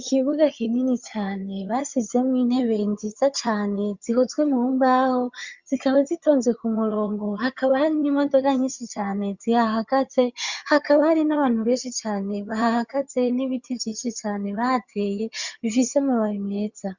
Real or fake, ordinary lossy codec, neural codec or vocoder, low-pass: fake; Opus, 64 kbps; vocoder, 22.05 kHz, 80 mel bands, HiFi-GAN; 7.2 kHz